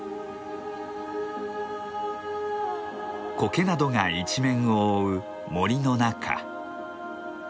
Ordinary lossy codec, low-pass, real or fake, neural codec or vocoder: none; none; real; none